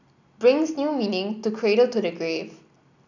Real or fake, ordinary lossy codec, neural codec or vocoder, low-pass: real; none; none; 7.2 kHz